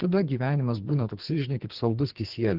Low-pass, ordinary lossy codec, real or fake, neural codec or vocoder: 5.4 kHz; Opus, 16 kbps; fake; codec, 44.1 kHz, 2.6 kbps, SNAC